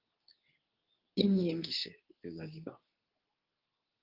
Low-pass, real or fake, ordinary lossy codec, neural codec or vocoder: 5.4 kHz; fake; Opus, 24 kbps; codec, 24 kHz, 0.9 kbps, WavTokenizer, medium speech release version 2